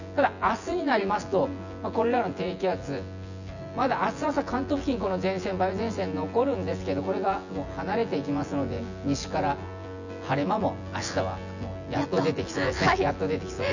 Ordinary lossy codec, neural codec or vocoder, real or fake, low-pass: none; vocoder, 24 kHz, 100 mel bands, Vocos; fake; 7.2 kHz